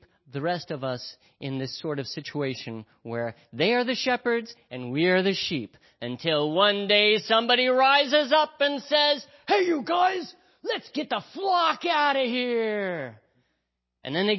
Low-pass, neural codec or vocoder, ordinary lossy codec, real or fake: 7.2 kHz; none; MP3, 24 kbps; real